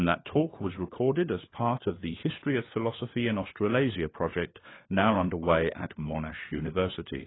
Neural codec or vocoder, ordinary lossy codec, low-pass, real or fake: codec, 16 kHz, 4 kbps, FunCodec, trained on LibriTTS, 50 frames a second; AAC, 16 kbps; 7.2 kHz; fake